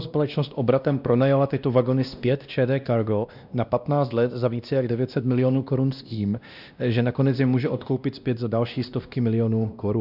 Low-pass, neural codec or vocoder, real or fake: 5.4 kHz; codec, 16 kHz, 1 kbps, X-Codec, WavLM features, trained on Multilingual LibriSpeech; fake